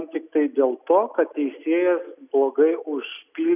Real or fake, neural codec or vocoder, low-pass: real; none; 3.6 kHz